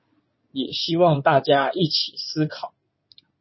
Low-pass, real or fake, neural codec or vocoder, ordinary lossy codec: 7.2 kHz; fake; codec, 16 kHz in and 24 kHz out, 2.2 kbps, FireRedTTS-2 codec; MP3, 24 kbps